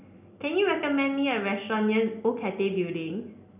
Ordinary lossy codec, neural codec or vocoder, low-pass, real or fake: none; none; 3.6 kHz; real